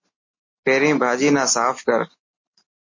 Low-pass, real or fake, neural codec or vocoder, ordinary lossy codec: 7.2 kHz; real; none; MP3, 32 kbps